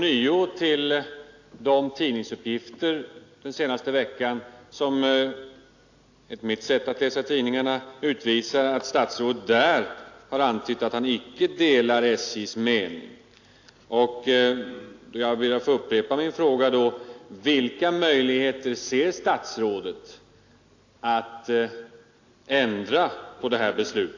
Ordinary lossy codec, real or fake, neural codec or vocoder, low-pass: AAC, 48 kbps; real; none; 7.2 kHz